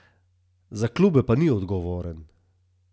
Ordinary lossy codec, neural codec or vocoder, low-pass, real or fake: none; none; none; real